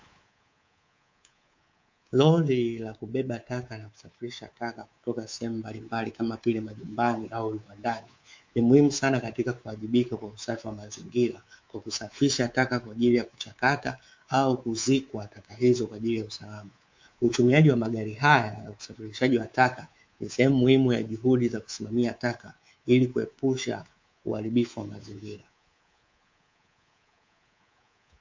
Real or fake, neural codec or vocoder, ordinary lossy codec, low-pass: fake; codec, 24 kHz, 3.1 kbps, DualCodec; MP3, 48 kbps; 7.2 kHz